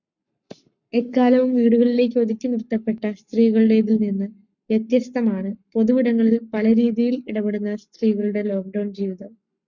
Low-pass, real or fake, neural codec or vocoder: 7.2 kHz; fake; codec, 44.1 kHz, 7.8 kbps, Pupu-Codec